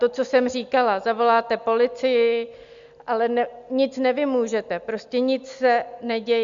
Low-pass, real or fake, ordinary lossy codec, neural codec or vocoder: 7.2 kHz; real; Opus, 64 kbps; none